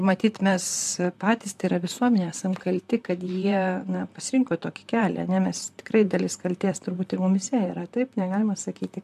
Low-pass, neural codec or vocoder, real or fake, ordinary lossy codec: 14.4 kHz; vocoder, 44.1 kHz, 128 mel bands, Pupu-Vocoder; fake; AAC, 96 kbps